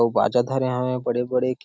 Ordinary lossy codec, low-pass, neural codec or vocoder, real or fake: none; none; none; real